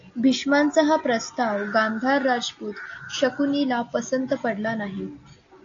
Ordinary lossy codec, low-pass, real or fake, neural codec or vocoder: AAC, 48 kbps; 7.2 kHz; real; none